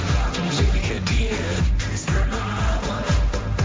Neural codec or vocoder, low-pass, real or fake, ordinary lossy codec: codec, 16 kHz, 1.1 kbps, Voila-Tokenizer; none; fake; none